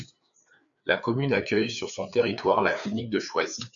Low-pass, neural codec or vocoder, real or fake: 7.2 kHz; codec, 16 kHz, 4 kbps, FreqCodec, larger model; fake